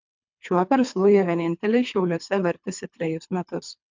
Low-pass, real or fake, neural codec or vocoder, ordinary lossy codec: 7.2 kHz; fake; codec, 24 kHz, 3 kbps, HILCodec; MP3, 64 kbps